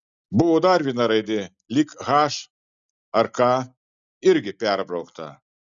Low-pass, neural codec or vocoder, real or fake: 7.2 kHz; none; real